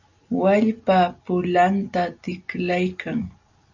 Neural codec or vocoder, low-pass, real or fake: none; 7.2 kHz; real